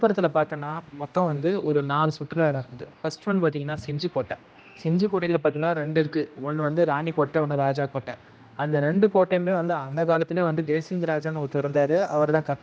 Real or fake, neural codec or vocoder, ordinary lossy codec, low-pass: fake; codec, 16 kHz, 1 kbps, X-Codec, HuBERT features, trained on general audio; none; none